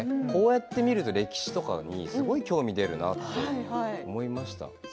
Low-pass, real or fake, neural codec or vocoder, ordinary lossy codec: none; real; none; none